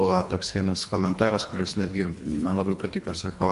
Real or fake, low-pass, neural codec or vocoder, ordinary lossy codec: fake; 10.8 kHz; codec, 24 kHz, 1.5 kbps, HILCodec; MP3, 64 kbps